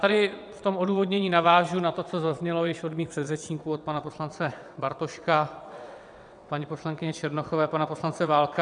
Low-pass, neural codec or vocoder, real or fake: 9.9 kHz; vocoder, 22.05 kHz, 80 mel bands, WaveNeXt; fake